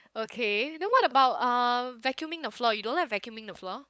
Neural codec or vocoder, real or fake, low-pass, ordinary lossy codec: codec, 16 kHz, 8 kbps, FunCodec, trained on LibriTTS, 25 frames a second; fake; none; none